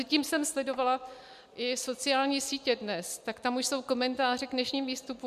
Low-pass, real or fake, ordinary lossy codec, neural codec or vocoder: 14.4 kHz; real; MP3, 96 kbps; none